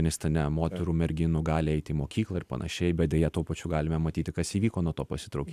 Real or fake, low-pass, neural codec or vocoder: real; 14.4 kHz; none